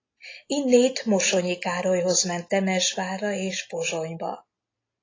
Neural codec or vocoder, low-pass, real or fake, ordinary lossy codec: none; 7.2 kHz; real; AAC, 32 kbps